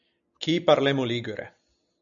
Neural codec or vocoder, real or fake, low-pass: none; real; 7.2 kHz